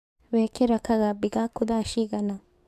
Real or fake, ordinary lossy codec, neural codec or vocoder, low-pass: fake; AAC, 96 kbps; codec, 44.1 kHz, 7.8 kbps, DAC; 14.4 kHz